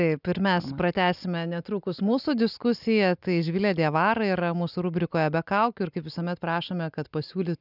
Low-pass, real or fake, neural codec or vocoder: 5.4 kHz; real; none